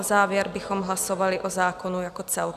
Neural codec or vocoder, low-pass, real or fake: none; 14.4 kHz; real